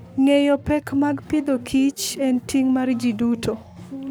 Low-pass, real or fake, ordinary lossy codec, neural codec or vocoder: none; fake; none; codec, 44.1 kHz, 7.8 kbps, Pupu-Codec